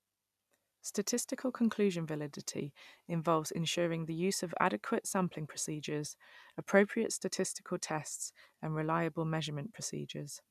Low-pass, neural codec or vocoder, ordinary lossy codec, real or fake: 14.4 kHz; none; none; real